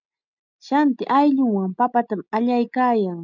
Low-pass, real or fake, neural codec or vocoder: 7.2 kHz; real; none